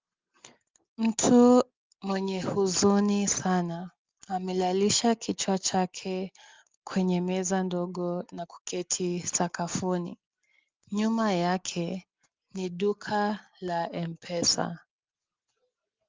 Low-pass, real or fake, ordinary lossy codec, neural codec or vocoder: 7.2 kHz; real; Opus, 24 kbps; none